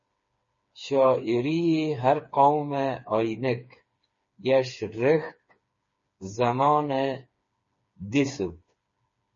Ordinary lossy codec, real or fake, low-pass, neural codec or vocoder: MP3, 32 kbps; fake; 7.2 kHz; codec, 16 kHz, 4 kbps, FreqCodec, smaller model